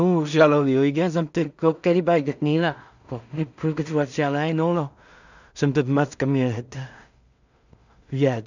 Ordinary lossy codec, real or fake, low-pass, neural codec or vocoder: none; fake; 7.2 kHz; codec, 16 kHz in and 24 kHz out, 0.4 kbps, LongCat-Audio-Codec, two codebook decoder